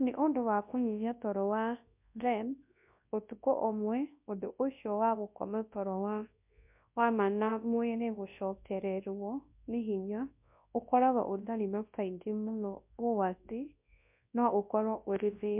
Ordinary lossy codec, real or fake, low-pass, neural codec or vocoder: MP3, 32 kbps; fake; 3.6 kHz; codec, 24 kHz, 0.9 kbps, WavTokenizer, large speech release